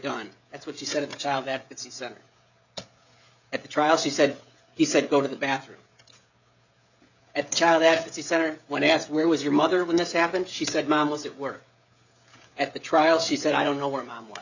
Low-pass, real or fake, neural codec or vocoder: 7.2 kHz; fake; codec, 16 kHz, 16 kbps, FreqCodec, smaller model